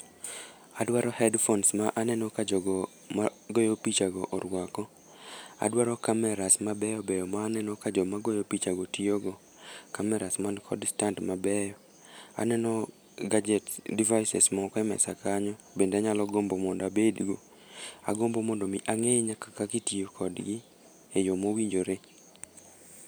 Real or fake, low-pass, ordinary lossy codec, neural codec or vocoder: real; none; none; none